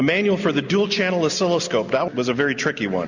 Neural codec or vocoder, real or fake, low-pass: none; real; 7.2 kHz